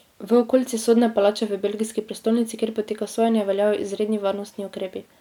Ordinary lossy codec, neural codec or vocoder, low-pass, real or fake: none; none; 19.8 kHz; real